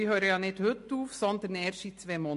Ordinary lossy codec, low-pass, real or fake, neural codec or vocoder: MP3, 48 kbps; 14.4 kHz; real; none